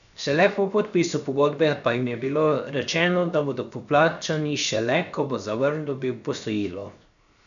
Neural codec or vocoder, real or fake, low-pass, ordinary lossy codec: codec, 16 kHz, 0.7 kbps, FocalCodec; fake; 7.2 kHz; none